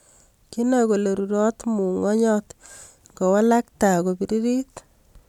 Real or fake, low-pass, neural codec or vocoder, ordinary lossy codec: real; 19.8 kHz; none; none